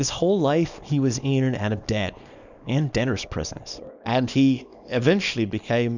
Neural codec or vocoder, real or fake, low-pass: codec, 24 kHz, 0.9 kbps, WavTokenizer, small release; fake; 7.2 kHz